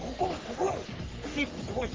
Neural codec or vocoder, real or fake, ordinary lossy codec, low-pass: codec, 44.1 kHz, 3.4 kbps, Pupu-Codec; fake; Opus, 16 kbps; 7.2 kHz